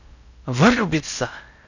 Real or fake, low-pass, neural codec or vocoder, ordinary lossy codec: fake; 7.2 kHz; codec, 16 kHz in and 24 kHz out, 0.6 kbps, FocalCodec, streaming, 4096 codes; none